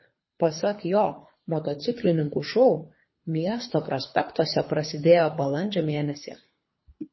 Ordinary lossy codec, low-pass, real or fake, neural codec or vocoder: MP3, 24 kbps; 7.2 kHz; fake; codec, 24 kHz, 3 kbps, HILCodec